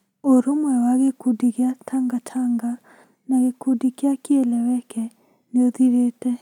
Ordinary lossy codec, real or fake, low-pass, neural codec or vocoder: none; real; 19.8 kHz; none